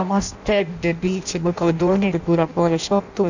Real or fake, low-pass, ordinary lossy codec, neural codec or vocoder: fake; 7.2 kHz; none; codec, 16 kHz in and 24 kHz out, 0.6 kbps, FireRedTTS-2 codec